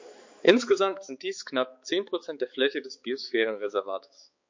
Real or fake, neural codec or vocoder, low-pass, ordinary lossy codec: fake; codec, 16 kHz, 4 kbps, X-Codec, HuBERT features, trained on balanced general audio; 7.2 kHz; MP3, 48 kbps